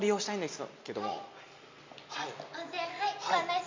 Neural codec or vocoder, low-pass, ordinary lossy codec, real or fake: none; 7.2 kHz; MP3, 64 kbps; real